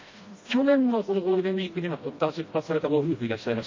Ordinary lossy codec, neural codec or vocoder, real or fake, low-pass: MP3, 32 kbps; codec, 16 kHz, 1 kbps, FreqCodec, smaller model; fake; 7.2 kHz